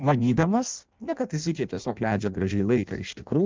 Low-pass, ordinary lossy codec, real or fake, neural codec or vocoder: 7.2 kHz; Opus, 24 kbps; fake; codec, 16 kHz in and 24 kHz out, 0.6 kbps, FireRedTTS-2 codec